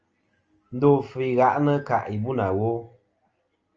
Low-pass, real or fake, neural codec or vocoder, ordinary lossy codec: 7.2 kHz; real; none; Opus, 24 kbps